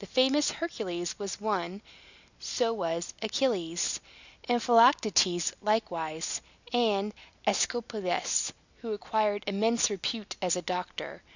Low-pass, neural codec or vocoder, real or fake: 7.2 kHz; none; real